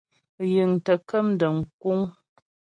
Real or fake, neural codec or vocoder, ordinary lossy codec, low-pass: real; none; MP3, 48 kbps; 9.9 kHz